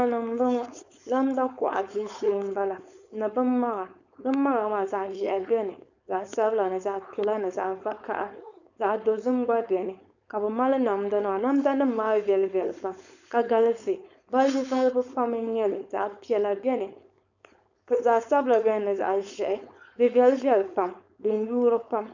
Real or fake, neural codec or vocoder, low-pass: fake; codec, 16 kHz, 4.8 kbps, FACodec; 7.2 kHz